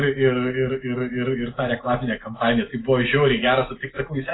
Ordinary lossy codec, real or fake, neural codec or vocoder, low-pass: AAC, 16 kbps; real; none; 7.2 kHz